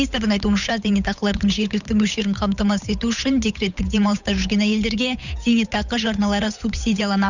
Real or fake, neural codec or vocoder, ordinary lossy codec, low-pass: fake; codec, 16 kHz, 8 kbps, FunCodec, trained on Chinese and English, 25 frames a second; none; 7.2 kHz